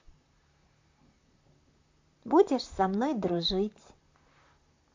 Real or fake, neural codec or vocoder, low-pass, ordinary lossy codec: fake; vocoder, 44.1 kHz, 128 mel bands, Pupu-Vocoder; 7.2 kHz; MP3, 48 kbps